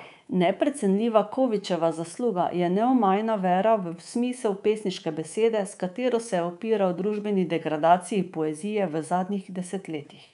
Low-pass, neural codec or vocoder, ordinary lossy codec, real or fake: 10.8 kHz; codec, 24 kHz, 3.1 kbps, DualCodec; none; fake